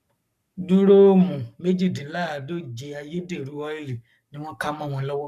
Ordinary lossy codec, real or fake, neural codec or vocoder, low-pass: none; fake; codec, 44.1 kHz, 7.8 kbps, Pupu-Codec; 14.4 kHz